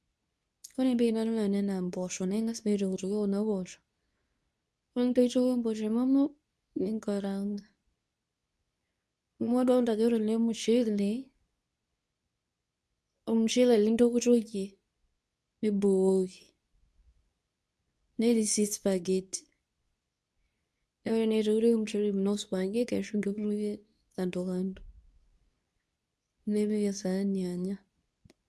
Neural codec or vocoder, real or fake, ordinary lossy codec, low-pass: codec, 24 kHz, 0.9 kbps, WavTokenizer, medium speech release version 2; fake; none; none